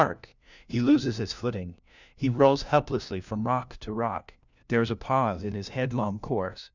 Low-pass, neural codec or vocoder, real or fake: 7.2 kHz; codec, 16 kHz, 1 kbps, FunCodec, trained on LibriTTS, 50 frames a second; fake